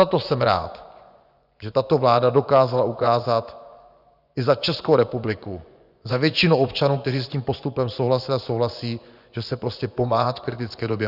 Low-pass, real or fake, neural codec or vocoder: 5.4 kHz; fake; vocoder, 24 kHz, 100 mel bands, Vocos